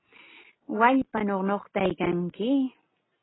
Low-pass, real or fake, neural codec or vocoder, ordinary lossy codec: 7.2 kHz; real; none; AAC, 16 kbps